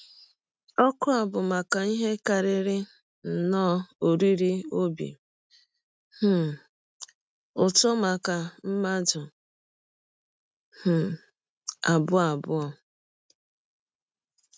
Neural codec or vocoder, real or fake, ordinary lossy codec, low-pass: none; real; none; none